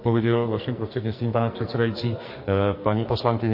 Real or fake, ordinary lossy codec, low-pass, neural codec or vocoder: fake; MP3, 32 kbps; 5.4 kHz; codec, 44.1 kHz, 2.6 kbps, SNAC